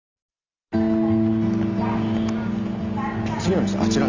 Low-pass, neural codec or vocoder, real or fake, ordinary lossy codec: 7.2 kHz; none; real; Opus, 64 kbps